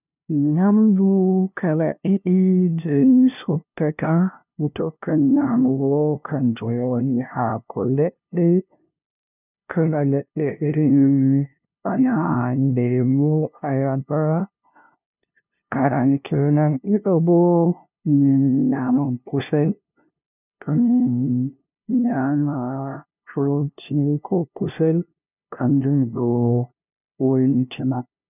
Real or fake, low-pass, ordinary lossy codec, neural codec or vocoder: fake; 3.6 kHz; none; codec, 16 kHz, 0.5 kbps, FunCodec, trained on LibriTTS, 25 frames a second